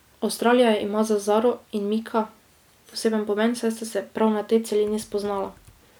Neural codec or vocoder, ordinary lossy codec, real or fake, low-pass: none; none; real; none